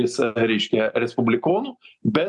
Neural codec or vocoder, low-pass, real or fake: none; 10.8 kHz; real